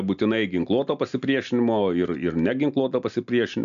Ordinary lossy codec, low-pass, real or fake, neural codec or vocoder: MP3, 64 kbps; 7.2 kHz; real; none